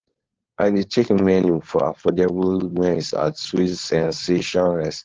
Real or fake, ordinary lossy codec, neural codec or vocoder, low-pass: fake; Opus, 16 kbps; codec, 16 kHz, 4.8 kbps, FACodec; 7.2 kHz